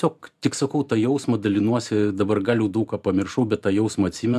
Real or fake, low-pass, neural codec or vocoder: fake; 14.4 kHz; vocoder, 44.1 kHz, 128 mel bands every 512 samples, BigVGAN v2